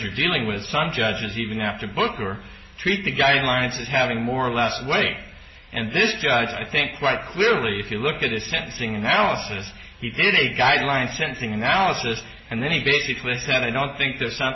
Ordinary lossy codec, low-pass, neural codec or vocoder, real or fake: MP3, 24 kbps; 7.2 kHz; none; real